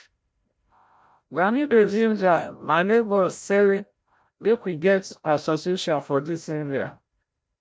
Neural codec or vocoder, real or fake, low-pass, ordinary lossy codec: codec, 16 kHz, 0.5 kbps, FreqCodec, larger model; fake; none; none